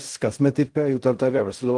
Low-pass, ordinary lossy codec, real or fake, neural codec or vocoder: 10.8 kHz; Opus, 24 kbps; fake; codec, 16 kHz in and 24 kHz out, 0.4 kbps, LongCat-Audio-Codec, fine tuned four codebook decoder